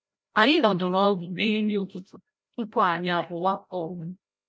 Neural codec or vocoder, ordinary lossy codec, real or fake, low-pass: codec, 16 kHz, 0.5 kbps, FreqCodec, larger model; none; fake; none